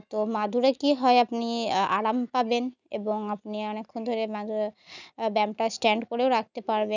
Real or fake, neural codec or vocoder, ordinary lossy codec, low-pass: real; none; none; 7.2 kHz